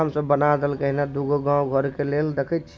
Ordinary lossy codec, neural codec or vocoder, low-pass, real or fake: none; none; none; real